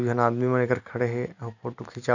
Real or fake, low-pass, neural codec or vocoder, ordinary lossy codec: real; 7.2 kHz; none; none